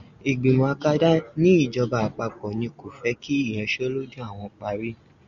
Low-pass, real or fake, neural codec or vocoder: 7.2 kHz; real; none